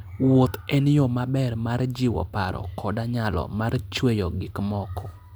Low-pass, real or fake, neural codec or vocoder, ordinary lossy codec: none; real; none; none